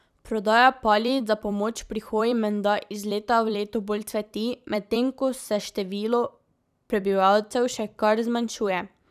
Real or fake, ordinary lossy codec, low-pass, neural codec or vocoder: fake; none; 14.4 kHz; vocoder, 44.1 kHz, 128 mel bands every 256 samples, BigVGAN v2